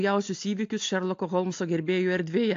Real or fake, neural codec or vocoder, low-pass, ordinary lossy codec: real; none; 7.2 kHz; AAC, 48 kbps